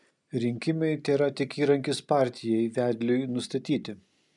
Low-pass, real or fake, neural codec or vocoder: 10.8 kHz; real; none